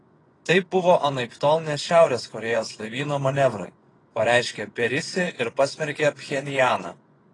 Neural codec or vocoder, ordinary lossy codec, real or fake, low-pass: vocoder, 24 kHz, 100 mel bands, Vocos; AAC, 32 kbps; fake; 10.8 kHz